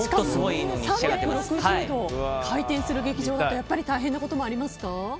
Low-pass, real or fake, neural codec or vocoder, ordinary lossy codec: none; real; none; none